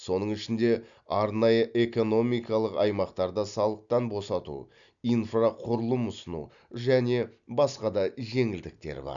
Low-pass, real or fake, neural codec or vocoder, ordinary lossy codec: 7.2 kHz; real; none; none